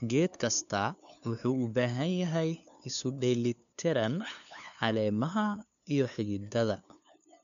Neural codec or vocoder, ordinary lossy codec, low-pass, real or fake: codec, 16 kHz, 2 kbps, FunCodec, trained on LibriTTS, 25 frames a second; none; 7.2 kHz; fake